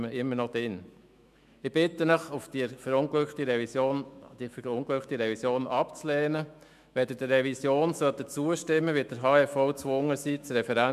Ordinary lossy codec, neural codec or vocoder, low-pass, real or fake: MP3, 96 kbps; autoencoder, 48 kHz, 128 numbers a frame, DAC-VAE, trained on Japanese speech; 14.4 kHz; fake